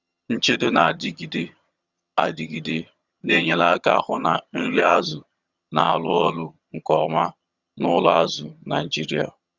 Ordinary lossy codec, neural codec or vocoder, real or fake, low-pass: Opus, 64 kbps; vocoder, 22.05 kHz, 80 mel bands, HiFi-GAN; fake; 7.2 kHz